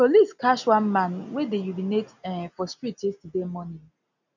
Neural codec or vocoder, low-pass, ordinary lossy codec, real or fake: none; 7.2 kHz; none; real